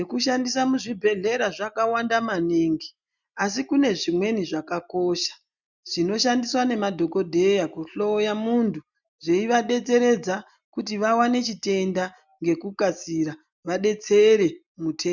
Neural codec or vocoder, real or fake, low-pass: none; real; 7.2 kHz